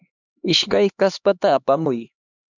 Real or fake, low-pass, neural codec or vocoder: fake; 7.2 kHz; codec, 16 kHz, 2 kbps, X-Codec, HuBERT features, trained on LibriSpeech